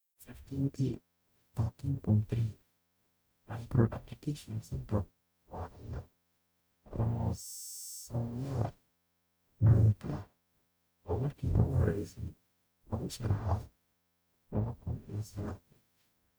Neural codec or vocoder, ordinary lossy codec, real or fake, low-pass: codec, 44.1 kHz, 0.9 kbps, DAC; none; fake; none